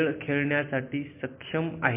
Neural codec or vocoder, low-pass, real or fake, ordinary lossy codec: none; 3.6 kHz; real; MP3, 24 kbps